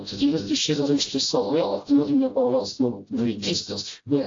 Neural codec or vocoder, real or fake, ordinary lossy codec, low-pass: codec, 16 kHz, 0.5 kbps, FreqCodec, smaller model; fake; AAC, 48 kbps; 7.2 kHz